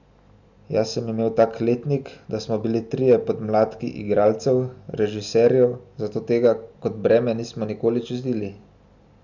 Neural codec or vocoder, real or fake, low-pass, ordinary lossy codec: none; real; 7.2 kHz; none